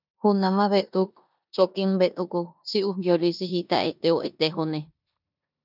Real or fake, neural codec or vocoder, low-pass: fake; codec, 16 kHz in and 24 kHz out, 0.9 kbps, LongCat-Audio-Codec, four codebook decoder; 5.4 kHz